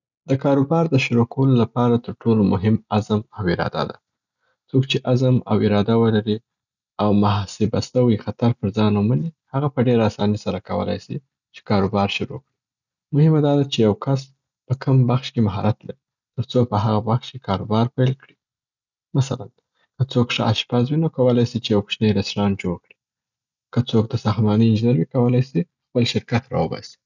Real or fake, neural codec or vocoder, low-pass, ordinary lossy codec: real; none; 7.2 kHz; none